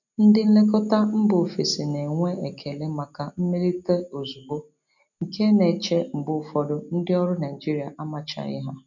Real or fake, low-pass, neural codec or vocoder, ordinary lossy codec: real; 7.2 kHz; none; none